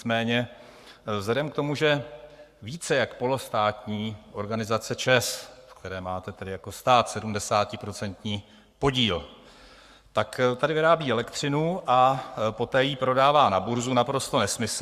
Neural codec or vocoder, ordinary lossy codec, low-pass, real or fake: codec, 44.1 kHz, 7.8 kbps, Pupu-Codec; Opus, 64 kbps; 14.4 kHz; fake